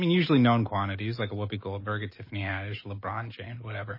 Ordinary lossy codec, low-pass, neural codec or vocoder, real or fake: MP3, 24 kbps; 5.4 kHz; none; real